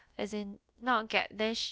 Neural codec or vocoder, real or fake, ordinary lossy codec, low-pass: codec, 16 kHz, about 1 kbps, DyCAST, with the encoder's durations; fake; none; none